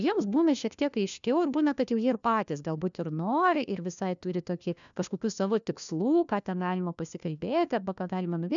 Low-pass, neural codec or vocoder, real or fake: 7.2 kHz; codec, 16 kHz, 1 kbps, FunCodec, trained on LibriTTS, 50 frames a second; fake